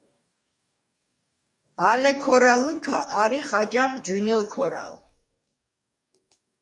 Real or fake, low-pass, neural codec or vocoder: fake; 10.8 kHz; codec, 44.1 kHz, 2.6 kbps, DAC